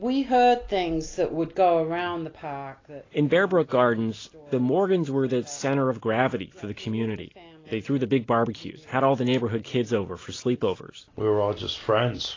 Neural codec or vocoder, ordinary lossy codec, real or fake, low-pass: none; AAC, 32 kbps; real; 7.2 kHz